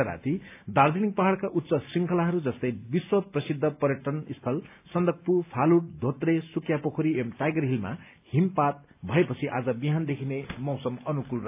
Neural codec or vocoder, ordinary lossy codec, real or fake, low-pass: none; AAC, 32 kbps; real; 3.6 kHz